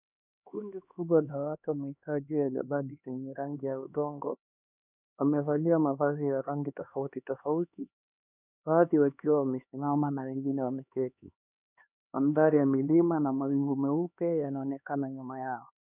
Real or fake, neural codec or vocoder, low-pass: fake; codec, 16 kHz, 2 kbps, X-Codec, HuBERT features, trained on LibriSpeech; 3.6 kHz